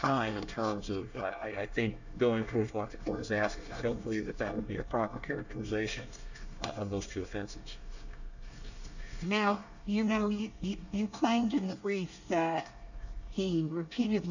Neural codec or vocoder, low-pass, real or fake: codec, 24 kHz, 1 kbps, SNAC; 7.2 kHz; fake